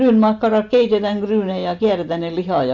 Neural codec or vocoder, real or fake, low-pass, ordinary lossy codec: none; real; 7.2 kHz; none